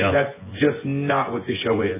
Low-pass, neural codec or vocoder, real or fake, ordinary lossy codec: 3.6 kHz; vocoder, 24 kHz, 100 mel bands, Vocos; fake; MP3, 16 kbps